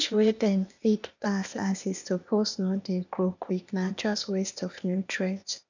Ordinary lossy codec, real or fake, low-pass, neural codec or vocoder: none; fake; 7.2 kHz; codec, 16 kHz, 0.8 kbps, ZipCodec